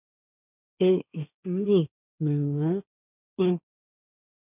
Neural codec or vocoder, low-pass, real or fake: codec, 16 kHz, 1.1 kbps, Voila-Tokenizer; 3.6 kHz; fake